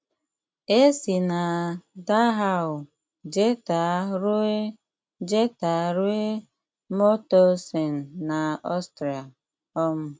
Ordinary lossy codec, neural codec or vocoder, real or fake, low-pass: none; none; real; none